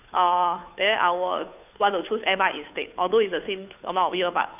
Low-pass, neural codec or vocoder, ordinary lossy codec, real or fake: 3.6 kHz; codec, 16 kHz, 2 kbps, FunCodec, trained on Chinese and English, 25 frames a second; none; fake